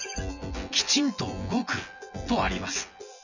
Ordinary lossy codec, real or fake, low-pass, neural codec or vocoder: none; fake; 7.2 kHz; vocoder, 24 kHz, 100 mel bands, Vocos